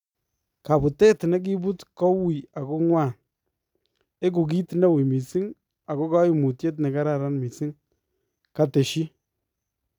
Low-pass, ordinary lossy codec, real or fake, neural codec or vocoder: 19.8 kHz; none; real; none